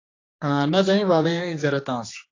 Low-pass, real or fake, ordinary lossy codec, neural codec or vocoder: 7.2 kHz; fake; AAC, 32 kbps; codec, 16 kHz, 2 kbps, X-Codec, HuBERT features, trained on general audio